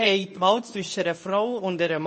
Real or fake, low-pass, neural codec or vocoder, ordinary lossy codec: fake; 10.8 kHz; codec, 24 kHz, 0.9 kbps, WavTokenizer, medium speech release version 2; MP3, 32 kbps